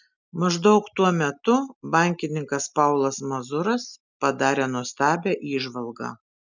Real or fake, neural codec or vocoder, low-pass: real; none; 7.2 kHz